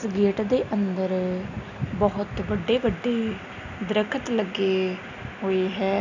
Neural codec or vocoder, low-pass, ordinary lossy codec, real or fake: none; 7.2 kHz; none; real